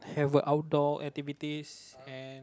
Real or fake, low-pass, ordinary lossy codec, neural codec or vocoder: real; none; none; none